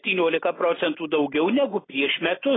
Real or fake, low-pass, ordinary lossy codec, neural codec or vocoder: real; 7.2 kHz; AAC, 16 kbps; none